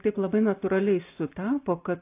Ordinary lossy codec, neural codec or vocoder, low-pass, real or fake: AAC, 24 kbps; none; 3.6 kHz; real